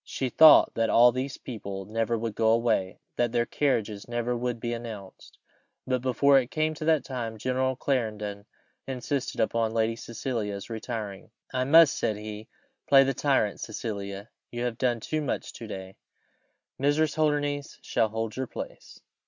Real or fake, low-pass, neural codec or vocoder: real; 7.2 kHz; none